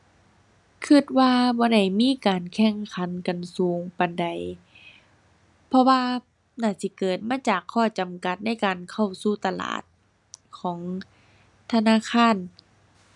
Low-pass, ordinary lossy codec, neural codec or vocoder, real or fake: 10.8 kHz; none; none; real